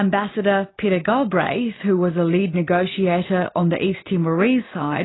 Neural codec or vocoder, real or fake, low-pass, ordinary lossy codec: none; real; 7.2 kHz; AAC, 16 kbps